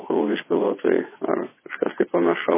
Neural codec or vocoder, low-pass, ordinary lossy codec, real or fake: vocoder, 22.05 kHz, 80 mel bands, WaveNeXt; 3.6 kHz; MP3, 16 kbps; fake